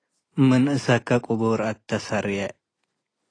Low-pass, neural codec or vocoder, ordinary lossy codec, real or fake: 9.9 kHz; none; AAC, 32 kbps; real